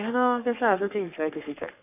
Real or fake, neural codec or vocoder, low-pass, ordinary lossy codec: fake; codec, 44.1 kHz, 3.4 kbps, Pupu-Codec; 3.6 kHz; none